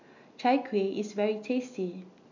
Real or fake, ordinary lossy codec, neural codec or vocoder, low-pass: real; none; none; 7.2 kHz